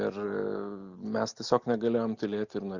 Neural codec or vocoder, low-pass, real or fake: none; 7.2 kHz; real